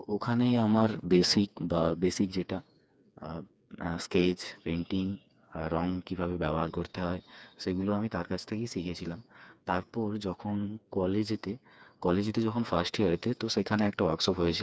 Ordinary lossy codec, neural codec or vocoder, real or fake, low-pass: none; codec, 16 kHz, 4 kbps, FreqCodec, smaller model; fake; none